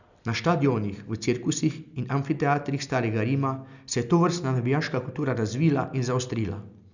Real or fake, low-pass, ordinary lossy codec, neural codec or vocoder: real; 7.2 kHz; none; none